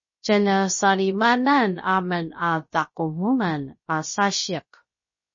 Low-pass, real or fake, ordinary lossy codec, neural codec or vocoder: 7.2 kHz; fake; MP3, 32 kbps; codec, 16 kHz, about 1 kbps, DyCAST, with the encoder's durations